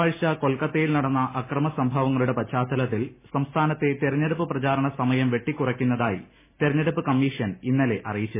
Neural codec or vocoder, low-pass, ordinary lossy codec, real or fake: none; 3.6 kHz; MP3, 16 kbps; real